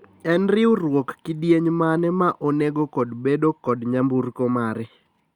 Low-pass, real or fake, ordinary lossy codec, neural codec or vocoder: 19.8 kHz; real; Opus, 64 kbps; none